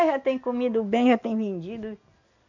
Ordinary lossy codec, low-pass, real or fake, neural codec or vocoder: none; 7.2 kHz; real; none